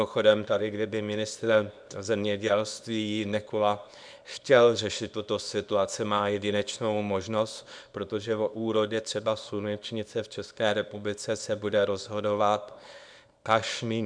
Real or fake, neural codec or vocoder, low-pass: fake; codec, 24 kHz, 0.9 kbps, WavTokenizer, small release; 9.9 kHz